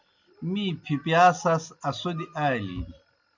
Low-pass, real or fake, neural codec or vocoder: 7.2 kHz; real; none